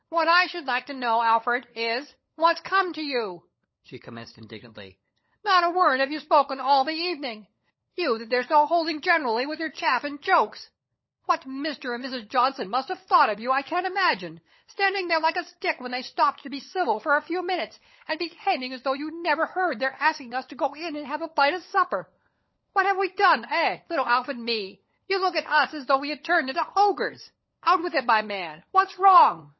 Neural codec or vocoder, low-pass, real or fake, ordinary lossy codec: codec, 16 kHz, 16 kbps, FunCodec, trained on LibriTTS, 50 frames a second; 7.2 kHz; fake; MP3, 24 kbps